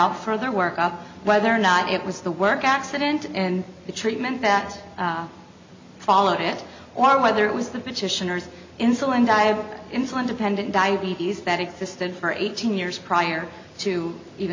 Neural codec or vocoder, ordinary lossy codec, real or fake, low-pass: none; MP3, 64 kbps; real; 7.2 kHz